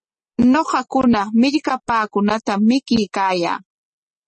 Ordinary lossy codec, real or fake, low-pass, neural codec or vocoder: MP3, 32 kbps; real; 10.8 kHz; none